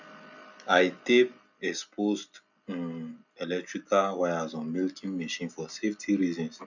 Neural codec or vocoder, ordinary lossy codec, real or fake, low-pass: none; none; real; 7.2 kHz